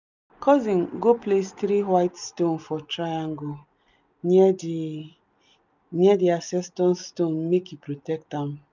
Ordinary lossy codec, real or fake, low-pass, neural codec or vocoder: none; real; 7.2 kHz; none